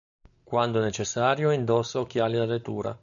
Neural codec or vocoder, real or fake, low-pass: none; real; 7.2 kHz